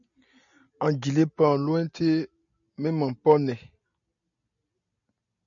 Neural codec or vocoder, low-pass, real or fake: none; 7.2 kHz; real